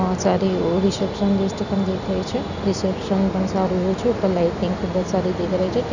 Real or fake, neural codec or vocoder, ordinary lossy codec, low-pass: real; none; none; 7.2 kHz